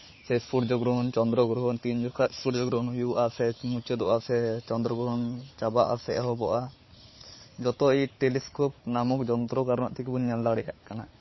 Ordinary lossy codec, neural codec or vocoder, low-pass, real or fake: MP3, 24 kbps; codec, 16 kHz, 4 kbps, FunCodec, trained on Chinese and English, 50 frames a second; 7.2 kHz; fake